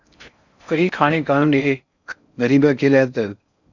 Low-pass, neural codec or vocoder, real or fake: 7.2 kHz; codec, 16 kHz in and 24 kHz out, 0.6 kbps, FocalCodec, streaming, 4096 codes; fake